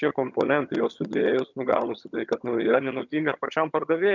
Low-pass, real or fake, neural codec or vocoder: 7.2 kHz; fake; vocoder, 22.05 kHz, 80 mel bands, HiFi-GAN